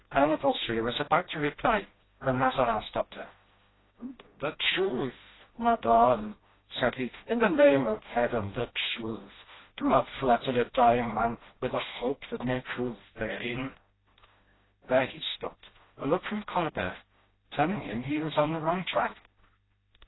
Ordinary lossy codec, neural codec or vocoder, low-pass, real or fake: AAC, 16 kbps; codec, 16 kHz, 1 kbps, FreqCodec, smaller model; 7.2 kHz; fake